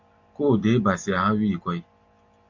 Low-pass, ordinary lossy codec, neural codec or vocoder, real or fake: 7.2 kHz; MP3, 64 kbps; none; real